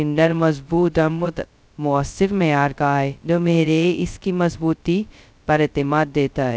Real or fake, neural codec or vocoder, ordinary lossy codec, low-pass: fake; codec, 16 kHz, 0.2 kbps, FocalCodec; none; none